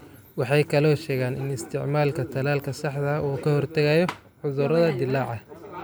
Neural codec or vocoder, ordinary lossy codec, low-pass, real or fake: none; none; none; real